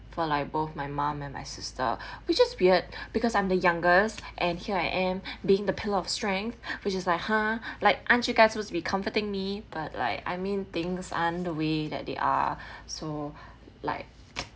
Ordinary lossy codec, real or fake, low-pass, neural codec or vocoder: none; real; none; none